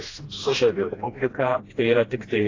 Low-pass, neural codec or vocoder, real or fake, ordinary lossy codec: 7.2 kHz; codec, 16 kHz, 1 kbps, FreqCodec, smaller model; fake; AAC, 32 kbps